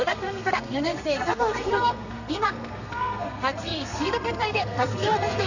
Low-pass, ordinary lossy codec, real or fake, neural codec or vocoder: 7.2 kHz; none; fake; codec, 32 kHz, 1.9 kbps, SNAC